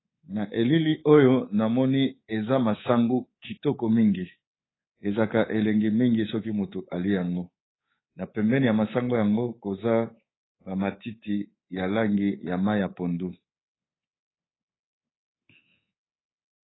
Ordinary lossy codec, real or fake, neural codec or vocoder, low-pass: AAC, 16 kbps; fake; codec, 24 kHz, 3.1 kbps, DualCodec; 7.2 kHz